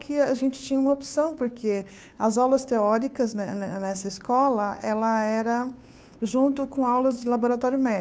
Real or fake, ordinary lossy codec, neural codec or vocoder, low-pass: fake; none; codec, 16 kHz, 2 kbps, FunCodec, trained on Chinese and English, 25 frames a second; none